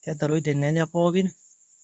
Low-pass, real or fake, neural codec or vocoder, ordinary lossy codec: none; fake; codec, 24 kHz, 0.9 kbps, WavTokenizer, medium speech release version 2; none